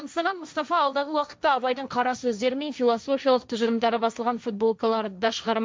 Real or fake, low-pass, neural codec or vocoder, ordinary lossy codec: fake; none; codec, 16 kHz, 1.1 kbps, Voila-Tokenizer; none